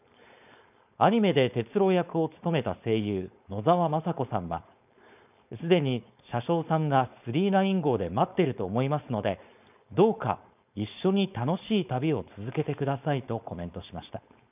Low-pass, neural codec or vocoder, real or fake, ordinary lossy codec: 3.6 kHz; codec, 16 kHz, 4.8 kbps, FACodec; fake; none